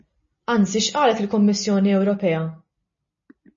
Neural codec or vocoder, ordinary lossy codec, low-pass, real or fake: none; MP3, 32 kbps; 7.2 kHz; real